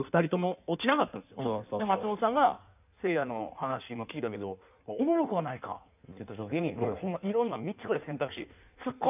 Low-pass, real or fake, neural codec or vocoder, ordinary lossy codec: 3.6 kHz; fake; codec, 16 kHz in and 24 kHz out, 1.1 kbps, FireRedTTS-2 codec; none